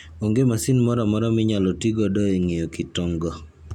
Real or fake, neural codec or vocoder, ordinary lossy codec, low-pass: real; none; none; 19.8 kHz